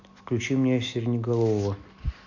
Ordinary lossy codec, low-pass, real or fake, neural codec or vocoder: none; 7.2 kHz; real; none